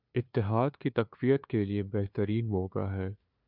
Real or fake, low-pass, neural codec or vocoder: fake; 5.4 kHz; codec, 16 kHz, 2 kbps, FunCodec, trained on LibriTTS, 25 frames a second